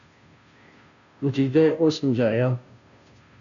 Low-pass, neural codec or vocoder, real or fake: 7.2 kHz; codec, 16 kHz, 0.5 kbps, FunCodec, trained on Chinese and English, 25 frames a second; fake